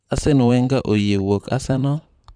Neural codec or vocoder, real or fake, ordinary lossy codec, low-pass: vocoder, 44.1 kHz, 128 mel bands, Pupu-Vocoder; fake; none; 9.9 kHz